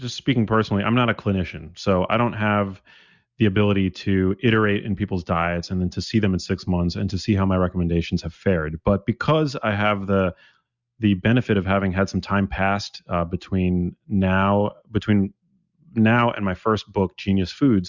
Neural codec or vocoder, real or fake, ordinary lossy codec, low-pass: none; real; Opus, 64 kbps; 7.2 kHz